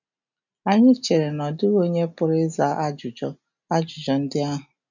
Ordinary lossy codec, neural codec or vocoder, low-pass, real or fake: none; none; 7.2 kHz; real